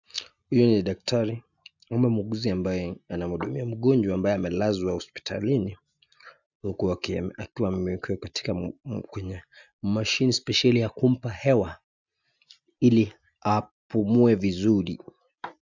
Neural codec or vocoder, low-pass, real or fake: none; 7.2 kHz; real